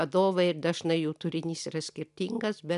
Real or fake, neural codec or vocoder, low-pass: real; none; 10.8 kHz